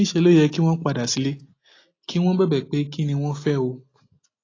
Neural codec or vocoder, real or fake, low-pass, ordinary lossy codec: none; real; 7.2 kHz; AAC, 32 kbps